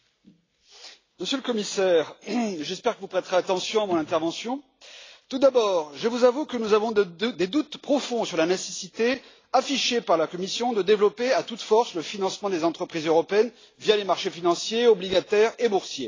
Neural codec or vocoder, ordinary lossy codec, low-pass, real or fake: none; AAC, 32 kbps; 7.2 kHz; real